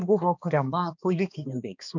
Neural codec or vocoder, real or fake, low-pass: codec, 16 kHz, 2 kbps, X-Codec, HuBERT features, trained on balanced general audio; fake; 7.2 kHz